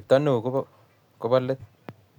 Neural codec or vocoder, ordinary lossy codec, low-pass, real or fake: none; none; 19.8 kHz; real